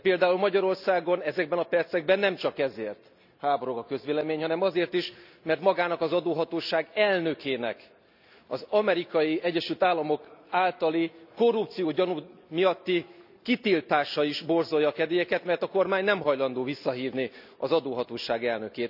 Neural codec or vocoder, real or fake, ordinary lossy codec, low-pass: none; real; none; 5.4 kHz